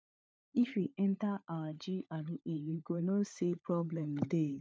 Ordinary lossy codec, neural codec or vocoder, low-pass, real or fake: none; codec, 16 kHz, 16 kbps, FunCodec, trained on LibriTTS, 50 frames a second; none; fake